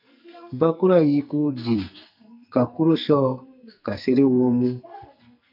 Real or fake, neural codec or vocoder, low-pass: fake; codec, 44.1 kHz, 2.6 kbps, SNAC; 5.4 kHz